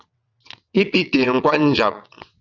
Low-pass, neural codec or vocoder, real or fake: 7.2 kHz; vocoder, 22.05 kHz, 80 mel bands, WaveNeXt; fake